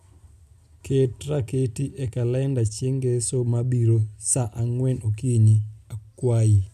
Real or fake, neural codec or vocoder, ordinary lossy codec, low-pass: real; none; none; 14.4 kHz